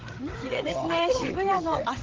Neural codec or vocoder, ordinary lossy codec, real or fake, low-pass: codec, 16 kHz, 8 kbps, FreqCodec, larger model; Opus, 16 kbps; fake; 7.2 kHz